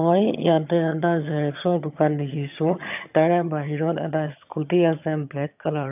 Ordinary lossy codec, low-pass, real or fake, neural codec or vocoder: none; 3.6 kHz; fake; vocoder, 22.05 kHz, 80 mel bands, HiFi-GAN